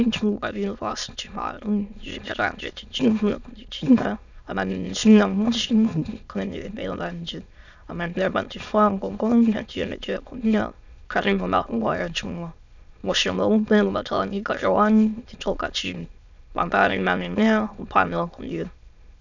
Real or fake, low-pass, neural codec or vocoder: fake; 7.2 kHz; autoencoder, 22.05 kHz, a latent of 192 numbers a frame, VITS, trained on many speakers